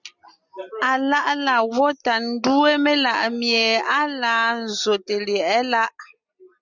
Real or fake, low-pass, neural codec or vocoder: real; 7.2 kHz; none